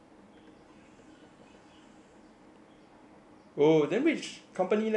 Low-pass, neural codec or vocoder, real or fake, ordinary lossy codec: 10.8 kHz; none; real; none